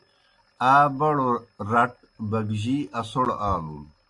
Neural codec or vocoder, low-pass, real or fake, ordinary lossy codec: none; 10.8 kHz; real; AAC, 48 kbps